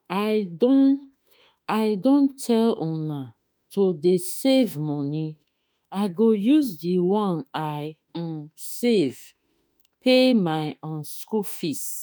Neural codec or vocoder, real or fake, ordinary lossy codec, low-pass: autoencoder, 48 kHz, 32 numbers a frame, DAC-VAE, trained on Japanese speech; fake; none; none